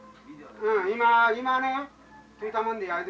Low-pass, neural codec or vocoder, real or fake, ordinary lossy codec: none; none; real; none